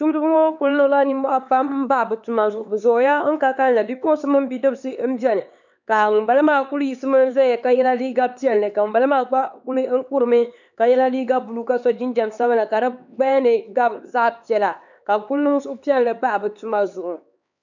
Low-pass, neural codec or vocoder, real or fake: 7.2 kHz; codec, 16 kHz, 4 kbps, X-Codec, HuBERT features, trained on LibriSpeech; fake